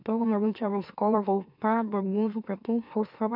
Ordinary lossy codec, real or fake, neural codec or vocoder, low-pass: none; fake; autoencoder, 44.1 kHz, a latent of 192 numbers a frame, MeloTTS; 5.4 kHz